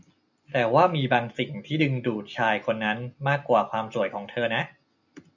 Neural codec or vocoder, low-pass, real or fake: none; 7.2 kHz; real